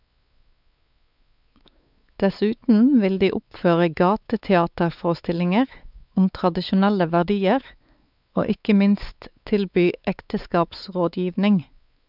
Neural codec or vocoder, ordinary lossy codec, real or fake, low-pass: codec, 16 kHz, 4 kbps, X-Codec, WavLM features, trained on Multilingual LibriSpeech; none; fake; 5.4 kHz